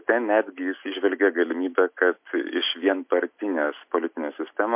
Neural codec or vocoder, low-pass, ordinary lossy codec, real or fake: none; 3.6 kHz; MP3, 32 kbps; real